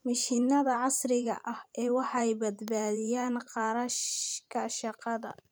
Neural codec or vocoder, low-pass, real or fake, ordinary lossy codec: vocoder, 44.1 kHz, 128 mel bands every 512 samples, BigVGAN v2; none; fake; none